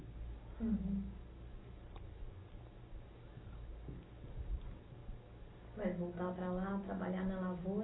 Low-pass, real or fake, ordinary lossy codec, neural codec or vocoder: 7.2 kHz; real; AAC, 16 kbps; none